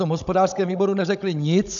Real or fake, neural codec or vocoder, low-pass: fake; codec, 16 kHz, 16 kbps, FunCodec, trained on Chinese and English, 50 frames a second; 7.2 kHz